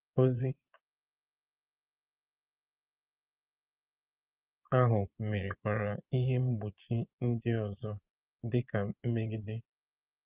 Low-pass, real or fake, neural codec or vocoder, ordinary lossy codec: 3.6 kHz; fake; vocoder, 22.05 kHz, 80 mel bands, Vocos; Opus, 32 kbps